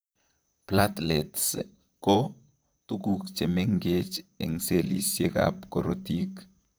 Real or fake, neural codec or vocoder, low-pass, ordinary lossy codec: fake; vocoder, 44.1 kHz, 128 mel bands, Pupu-Vocoder; none; none